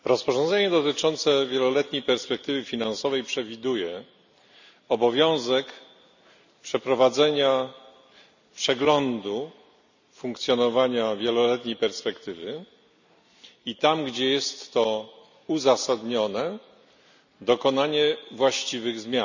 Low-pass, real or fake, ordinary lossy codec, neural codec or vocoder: 7.2 kHz; real; none; none